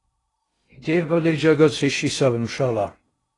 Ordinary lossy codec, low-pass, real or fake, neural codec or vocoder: AAC, 32 kbps; 10.8 kHz; fake; codec, 16 kHz in and 24 kHz out, 0.6 kbps, FocalCodec, streaming, 2048 codes